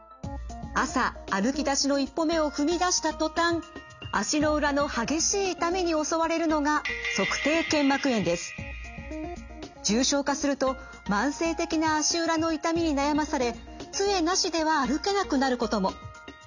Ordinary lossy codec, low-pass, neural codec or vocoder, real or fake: none; 7.2 kHz; none; real